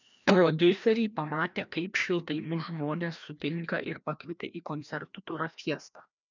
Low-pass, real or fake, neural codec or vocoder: 7.2 kHz; fake; codec, 16 kHz, 1 kbps, FreqCodec, larger model